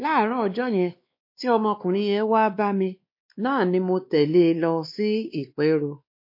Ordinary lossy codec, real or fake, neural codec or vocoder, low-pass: MP3, 32 kbps; fake; codec, 16 kHz, 2 kbps, X-Codec, WavLM features, trained on Multilingual LibriSpeech; 5.4 kHz